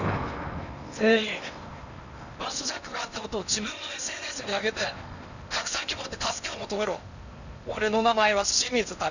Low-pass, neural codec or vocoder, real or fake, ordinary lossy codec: 7.2 kHz; codec, 16 kHz in and 24 kHz out, 0.8 kbps, FocalCodec, streaming, 65536 codes; fake; none